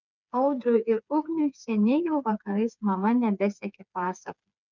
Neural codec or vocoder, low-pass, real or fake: codec, 16 kHz, 4 kbps, FreqCodec, smaller model; 7.2 kHz; fake